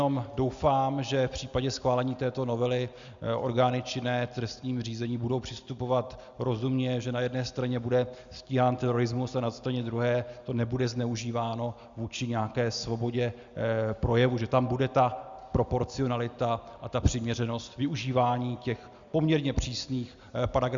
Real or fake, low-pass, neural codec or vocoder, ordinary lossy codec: real; 7.2 kHz; none; Opus, 64 kbps